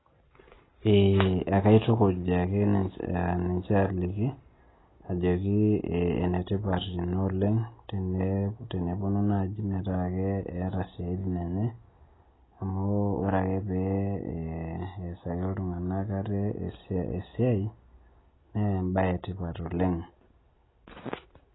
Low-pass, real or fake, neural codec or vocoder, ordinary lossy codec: 7.2 kHz; real; none; AAC, 16 kbps